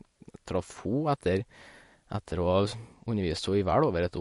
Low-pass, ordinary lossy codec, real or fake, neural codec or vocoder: 14.4 kHz; MP3, 48 kbps; real; none